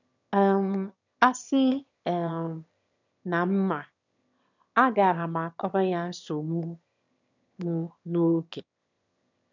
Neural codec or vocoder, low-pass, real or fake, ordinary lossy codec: autoencoder, 22.05 kHz, a latent of 192 numbers a frame, VITS, trained on one speaker; 7.2 kHz; fake; none